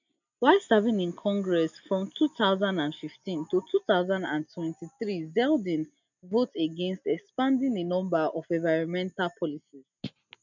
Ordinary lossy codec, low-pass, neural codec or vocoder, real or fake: none; 7.2 kHz; none; real